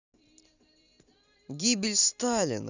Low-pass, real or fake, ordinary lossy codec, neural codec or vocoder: 7.2 kHz; fake; none; vocoder, 44.1 kHz, 128 mel bands every 256 samples, BigVGAN v2